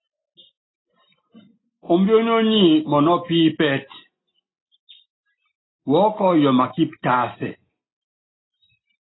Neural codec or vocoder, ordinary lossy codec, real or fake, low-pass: none; AAC, 16 kbps; real; 7.2 kHz